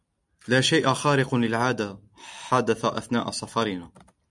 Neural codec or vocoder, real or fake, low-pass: none; real; 10.8 kHz